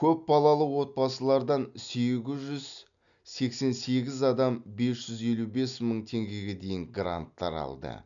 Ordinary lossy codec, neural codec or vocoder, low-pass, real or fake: none; none; 7.2 kHz; real